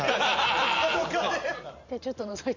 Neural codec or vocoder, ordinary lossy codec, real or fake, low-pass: vocoder, 44.1 kHz, 128 mel bands every 512 samples, BigVGAN v2; Opus, 64 kbps; fake; 7.2 kHz